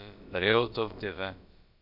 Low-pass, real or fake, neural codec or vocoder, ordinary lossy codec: 5.4 kHz; fake; codec, 16 kHz, about 1 kbps, DyCAST, with the encoder's durations; MP3, 48 kbps